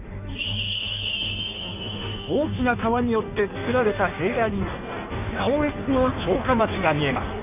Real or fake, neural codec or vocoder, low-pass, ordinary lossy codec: fake; codec, 16 kHz in and 24 kHz out, 1.1 kbps, FireRedTTS-2 codec; 3.6 kHz; none